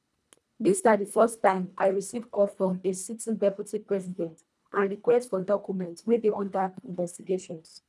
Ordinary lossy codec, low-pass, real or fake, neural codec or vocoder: none; none; fake; codec, 24 kHz, 1.5 kbps, HILCodec